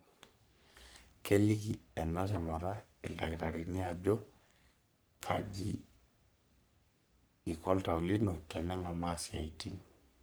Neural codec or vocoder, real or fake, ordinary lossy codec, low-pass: codec, 44.1 kHz, 3.4 kbps, Pupu-Codec; fake; none; none